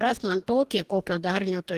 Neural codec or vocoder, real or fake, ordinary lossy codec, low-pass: codec, 44.1 kHz, 2.6 kbps, DAC; fake; Opus, 24 kbps; 14.4 kHz